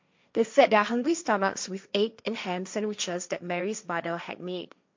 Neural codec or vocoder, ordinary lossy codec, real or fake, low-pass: codec, 16 kHz, 1.1 kbps, Voila-Tokenizer; MP3, 64 kbps; fake; 7.2 kHz